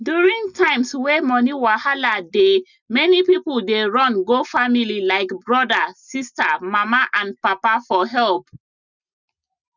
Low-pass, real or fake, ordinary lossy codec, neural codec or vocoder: 7.2 kHz; real; none; none